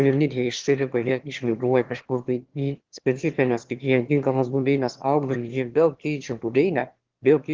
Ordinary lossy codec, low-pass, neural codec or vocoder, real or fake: Opus, 16 kbps; 7.2 kHz; autoencoder, 22.05 kHz, a latent of 192 numbers a frame, VITS, trained on one speaker; fake